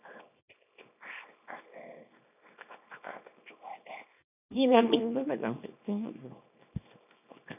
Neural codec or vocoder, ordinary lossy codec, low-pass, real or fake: codec, 24 kHz, 0.9 kbps, WavTokenizer, small release; none; 3.6 kHz; fake